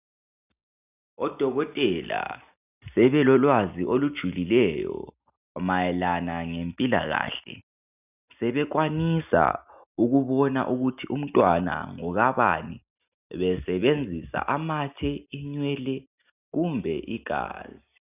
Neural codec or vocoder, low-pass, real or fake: none; 3.6 kHz; real